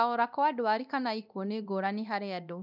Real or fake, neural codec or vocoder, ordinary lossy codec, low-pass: fake; codec, 24 kHz, 0.9 kbps, DualCodec; none; 5.4 kHz